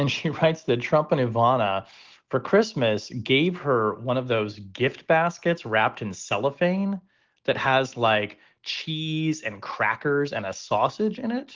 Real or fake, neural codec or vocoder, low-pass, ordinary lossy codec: real; none; 7.2 kHz; Opus, 16 kbps